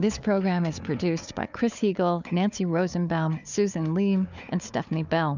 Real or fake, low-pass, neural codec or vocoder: fake; 7.2 kHz; codec, 16 kHz, 4 kbps, FunCodec, trained on Chinese and English, 50 frames a second